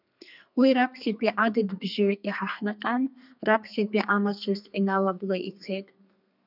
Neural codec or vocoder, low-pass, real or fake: codec, 32 kHz, 1.9 kbps, SNAC; 5.4 kHz; fake